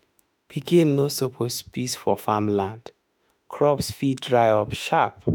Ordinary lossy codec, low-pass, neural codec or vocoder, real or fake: none; none; autoencoder, 48 kHz, 32 numbers a frame, DAC-VAE, trained on Japanese speech; fake